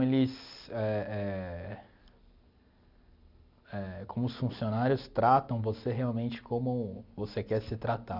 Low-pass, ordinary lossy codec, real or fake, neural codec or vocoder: 5.4 kHz; none; real; none